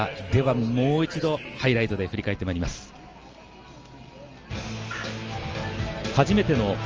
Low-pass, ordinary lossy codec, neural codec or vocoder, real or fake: 7.2 kHz; Opus, 24 kbps; none; real